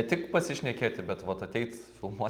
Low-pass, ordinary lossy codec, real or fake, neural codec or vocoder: 19.8 kHz; Opus, 32 kbps; real; none